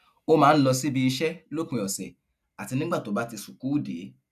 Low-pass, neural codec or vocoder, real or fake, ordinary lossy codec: 14.4 kHz; none; real; none